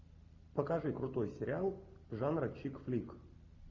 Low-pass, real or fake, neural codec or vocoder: 7.2 kHz; real; none